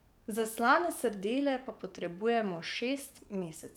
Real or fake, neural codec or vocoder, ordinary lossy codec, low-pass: fake; codec, 44.1 kHz, 7.8 kbps, DAC; none; 19.8 kHz